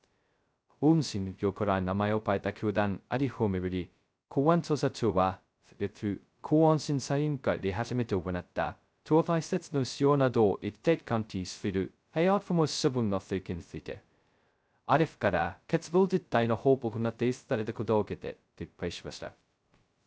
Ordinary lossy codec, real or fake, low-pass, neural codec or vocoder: none; fake; none; codec, 16 kHz, 0.2 kbps, FocalCodec